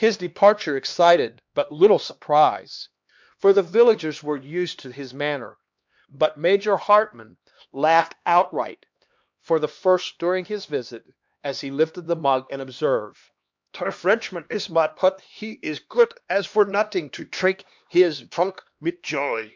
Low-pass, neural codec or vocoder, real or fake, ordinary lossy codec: 7.2 kHz; codec, 16 kHz, 2 kbps, X-Codec, HuBERT features, trained on LibriSpeech; fake; MP3, 64 kbps